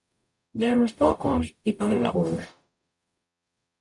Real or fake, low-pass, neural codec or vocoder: fake; 10.8 kHz; codec, 44.1 kHz, 0.9 kbps, DAC